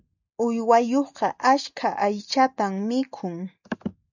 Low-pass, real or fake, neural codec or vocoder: 7.2 kHz; real; none